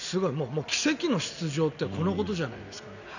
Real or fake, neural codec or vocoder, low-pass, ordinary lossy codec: real; none; 7.2 kHz; none